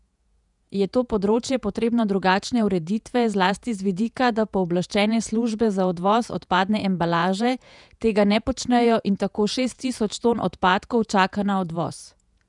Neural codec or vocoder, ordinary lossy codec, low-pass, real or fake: vocoder, 48 kHz, 128 mel bands, Vocos; none; 10.8 kHz; fake